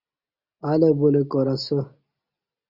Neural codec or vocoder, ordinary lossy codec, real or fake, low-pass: none; Opus, 64 kbps; real; 5.4 kHz